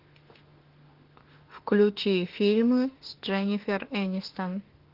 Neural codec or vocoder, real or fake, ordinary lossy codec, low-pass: autoencoder, 48 kHz, 32 numbers a frame, DAC-VAE, trained on Japanese speech; fake; Opus, 16 kbps; 5.4 kHz